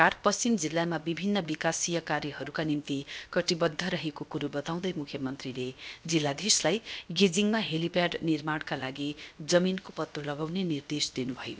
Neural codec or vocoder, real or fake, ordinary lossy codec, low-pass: codec, 16 kHz, about 1 kbps, DyCAST, with the encoder's durations; fake; none; none